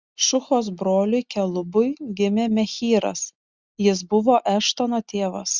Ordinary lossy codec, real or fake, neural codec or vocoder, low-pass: Opus, 64 kbps; real; none; 7.2 kHz